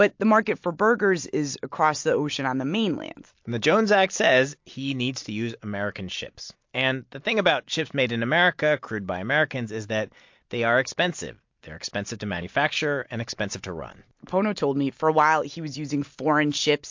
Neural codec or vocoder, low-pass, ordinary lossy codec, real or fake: none; 7.2 kHz; MP3, 48 kbps; real